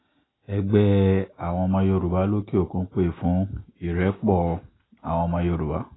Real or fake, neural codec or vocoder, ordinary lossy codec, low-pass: real; none; AAC, 16 kbps; 7.2 kHz